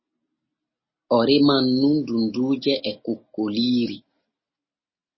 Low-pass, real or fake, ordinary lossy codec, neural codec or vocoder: 7.2 kHz; real; MP3, 24 kbps; none